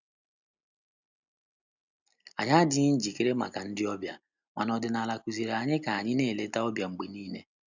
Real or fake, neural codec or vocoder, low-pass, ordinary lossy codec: real; none; 7.2 kHz; none